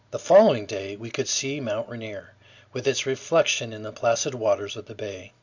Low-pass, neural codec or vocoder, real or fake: 7.2 kHz; none; real